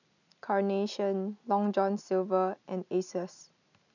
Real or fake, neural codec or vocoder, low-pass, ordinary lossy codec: real; none; 7.2 kHz; none